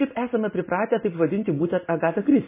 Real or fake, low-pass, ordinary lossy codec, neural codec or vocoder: real; 3.6 kHz; MP3, 16 kbps; none